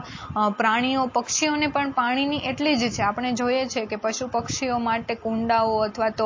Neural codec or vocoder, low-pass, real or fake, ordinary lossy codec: none; 7.2 kHz; real; MP3, 32 kbps